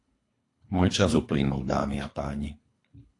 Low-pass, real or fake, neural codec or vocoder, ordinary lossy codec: 10.8 kHz; fake; codec, 24 kHz, 3 kbps, HILCodec; AAC, 48 kbps